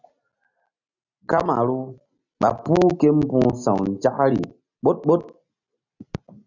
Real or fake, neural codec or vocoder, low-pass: real; none; 7.2 kHz